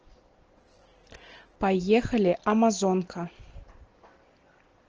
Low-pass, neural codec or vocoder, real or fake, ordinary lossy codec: 7.2 kHz; none; real; Opus, 16 kbps